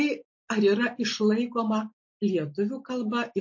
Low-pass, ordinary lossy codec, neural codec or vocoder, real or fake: 7.2 kHz; MP3, 32 kbps; none; real